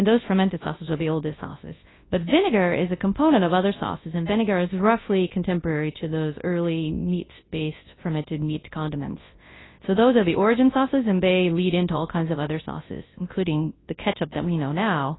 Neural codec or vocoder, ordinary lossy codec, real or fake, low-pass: codec, 24 kHz, 0.9 kbps, WavTokenizer, large speech release; AAC, 16 kbps; fake; 7.2 kHz